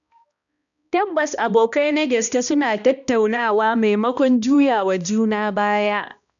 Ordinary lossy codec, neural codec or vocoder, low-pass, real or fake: none; codec, 16 kHz, 1 kbps, X-Codec, HuBERT features, trained on balanced general audio; 7.2 kHz; fake